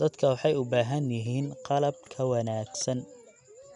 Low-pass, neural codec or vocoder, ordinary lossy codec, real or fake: 10.8 kHz; none; none; real